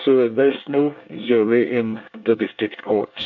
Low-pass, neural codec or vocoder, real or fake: 7.2 kHz; codec, 24 kHz, 1 kbps, SNAC; fake